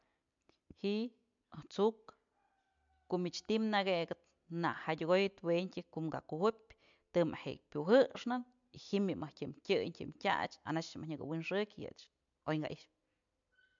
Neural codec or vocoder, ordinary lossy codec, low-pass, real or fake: none; MP3, 64 kbps; 7.2 kHz; real